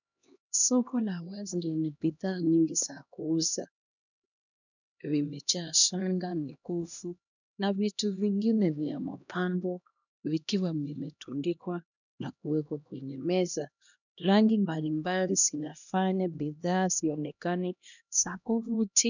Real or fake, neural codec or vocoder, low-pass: fake; codec, 16 kHz, 1 kbps, X-Codec, HuBERT features, trained on LibriSpeech; 7.2 kHz